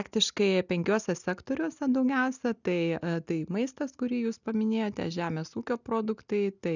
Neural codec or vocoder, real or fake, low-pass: none; real; 7.2 kHz